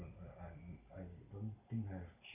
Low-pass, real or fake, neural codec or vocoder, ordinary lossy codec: 3.6 kHz; real; none; none